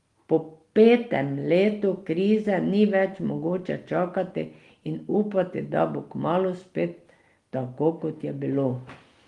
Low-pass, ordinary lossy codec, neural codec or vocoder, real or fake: 10.8 kHz; Opus, 24 kbps; none; real